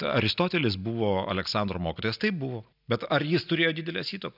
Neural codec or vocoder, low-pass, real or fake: none; 5.4 kHz; real